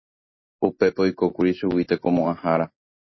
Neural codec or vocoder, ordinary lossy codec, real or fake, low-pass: none; MP3, 24 kbps; real; 7.2 kHz